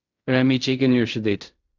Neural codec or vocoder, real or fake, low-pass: codec, 16 kHz in and 24 kHz out, 0.4 kbps, LongCat-Audio-Codec, fine tuned four codebook decoder; fake; 7.2 kHz